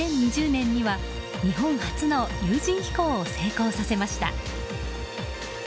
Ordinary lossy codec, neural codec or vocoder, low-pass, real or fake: none; none; none; real